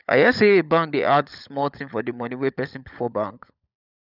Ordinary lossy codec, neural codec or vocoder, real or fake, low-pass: none; codec, 16 kHz, 16 kbps, FunCodec, trained on LibriTTS, 50 frames a second; fake; 5.4 kHz